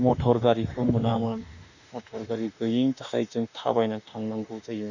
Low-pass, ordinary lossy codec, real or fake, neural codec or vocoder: 7.2 kHz; none; fake; autoencoder, 48 kHz, 32 numbers a frame, DAC-VAE, trained on Japanese speech